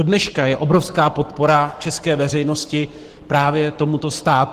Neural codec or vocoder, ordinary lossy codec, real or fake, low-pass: codec, 44.1 kHz, 7.8 kbps, Pupu-Codec; Opus, 16 kbps; fake; 14.4 kHz